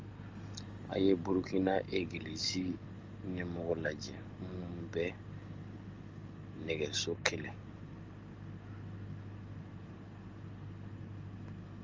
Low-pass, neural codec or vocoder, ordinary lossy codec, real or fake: 7.2 kHz; none; Opus, 32 kbps; real